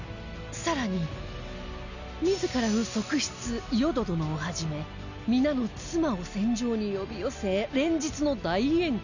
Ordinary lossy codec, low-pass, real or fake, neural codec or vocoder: none; 7.2 kHz; real; none